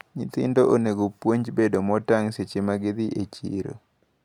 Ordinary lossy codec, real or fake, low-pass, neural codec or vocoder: none; real; 19.8 kHz; none